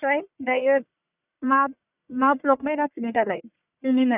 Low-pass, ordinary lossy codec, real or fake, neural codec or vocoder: 3.6 kHz; none; fake; codec, 44.1 kHz, 1.7 kbps, Pupu-Codec